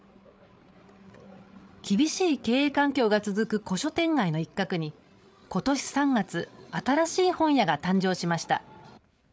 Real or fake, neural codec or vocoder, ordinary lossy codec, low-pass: fake; codec, 16 kHz, 8 kbps, FreqCodec, larger model; none; none